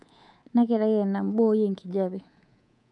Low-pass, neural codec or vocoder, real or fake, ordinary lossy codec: 10.8 kHz; codec, 24 kHz, 3.1 kbps, DualCodec; fake; none